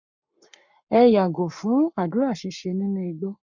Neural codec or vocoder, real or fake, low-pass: codec, 44.1 kHz, 7.8 kbps, Pupu-Codec; fake; 7.2 kHz